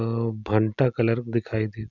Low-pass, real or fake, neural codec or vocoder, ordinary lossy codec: 7.2 kHz; real; none; none